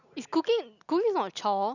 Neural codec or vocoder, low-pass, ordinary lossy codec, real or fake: none; 7.2 kHz; none; real